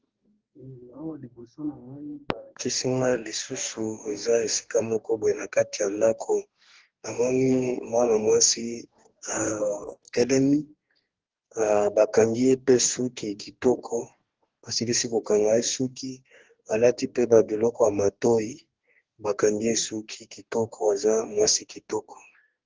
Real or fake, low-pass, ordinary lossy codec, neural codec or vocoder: fake; 7.2 kHz; Opus, 16 kbps; codec, 44.1 kHz, 2.6 kbps, DAC